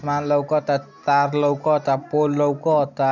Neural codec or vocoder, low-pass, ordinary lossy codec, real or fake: none; 7.2 kHz; none; real